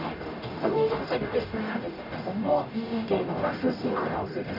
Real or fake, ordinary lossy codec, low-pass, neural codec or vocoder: fake; none; 5.4 kHz; codec, 44.1 kHz, 0.9 kbps, DAC